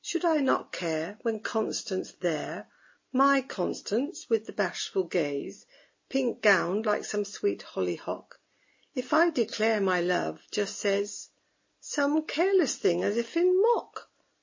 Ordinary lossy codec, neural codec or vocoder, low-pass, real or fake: MP3, 32 kbps; none; 7.2 kHz; real